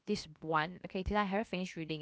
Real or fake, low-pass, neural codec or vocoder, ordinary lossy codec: fake; none; codec, 16 kHz, 0.7 kbps, FocalCodec; none